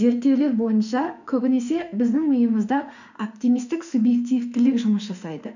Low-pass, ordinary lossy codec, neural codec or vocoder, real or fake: 7.2 kHz; none; autoencoder, 48 kHz, 32 numbers a frame, DAC-VAE, trained on Japanese speech; fake